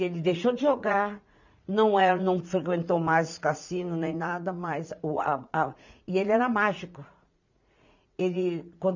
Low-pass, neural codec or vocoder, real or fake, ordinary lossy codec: 7.2 kHz; vocoder, 44.1 kHz, 80 mel bands, Vocos; fake; none